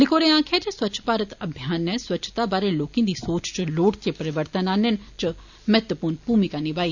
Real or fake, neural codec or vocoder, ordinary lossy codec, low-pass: real; none; none; 7.2 kHz